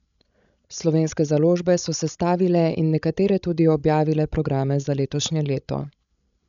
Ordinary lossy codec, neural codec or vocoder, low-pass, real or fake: none; codec, 16 kHz, 16 kbps, FreqCodec, larger model; 7.2 kHz; fake